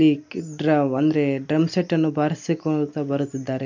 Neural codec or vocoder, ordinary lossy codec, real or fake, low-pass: none; MP3, 48 kbps; real; 7.2 kHz